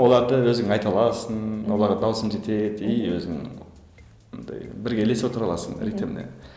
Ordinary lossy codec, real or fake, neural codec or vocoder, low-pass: none; real; none; none